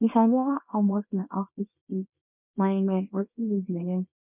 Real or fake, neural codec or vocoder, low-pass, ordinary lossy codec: fake; codec, 24 kHz, 0.9 kbps, WavTokenizer, small release; 3.6 kHz; none